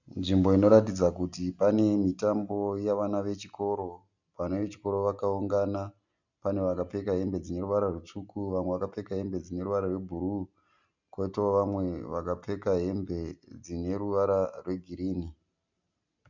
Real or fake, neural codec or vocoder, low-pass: real; none; 7.2 kHz